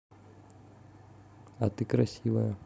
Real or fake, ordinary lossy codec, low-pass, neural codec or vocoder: real; none; none; none